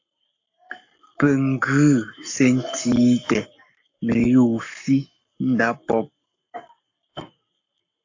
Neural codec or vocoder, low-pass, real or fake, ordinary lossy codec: codec, 44.1 kHz, 7.8 kbps, Pupu-Codec; 7.2 kHz; fake; AAC, 48 kbps